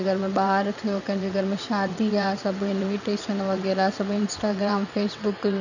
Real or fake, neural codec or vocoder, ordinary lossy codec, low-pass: fake; vocoder, 22.05 kHz, 80 mel bands, WaveNeXt; none; 7.2 kHz